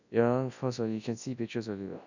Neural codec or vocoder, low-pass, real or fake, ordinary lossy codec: codec, 24 kHz, 0.9 kbps, WavTokenizer, large speech release; 7.2 kHz; fake; none